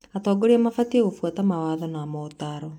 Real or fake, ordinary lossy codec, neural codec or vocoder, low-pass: real; none; none; 19.8 kHz